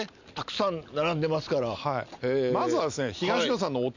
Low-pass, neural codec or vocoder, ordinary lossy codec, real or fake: 7.2 kHz; none; none; real